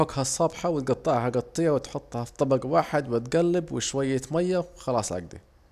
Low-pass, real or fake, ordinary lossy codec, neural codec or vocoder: 14.4 kHz; real; none; none